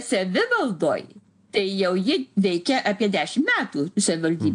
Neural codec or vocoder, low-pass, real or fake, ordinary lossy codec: none; 9.9 kHz; real; AAC, 64 kbps